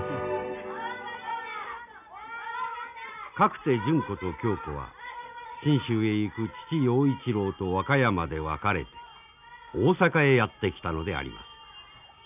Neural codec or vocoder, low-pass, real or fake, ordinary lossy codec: none; 3.6 kHz; real; none